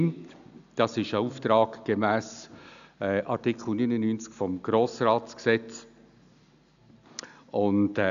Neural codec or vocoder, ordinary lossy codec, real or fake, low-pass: none; none; real; 7.2 kHz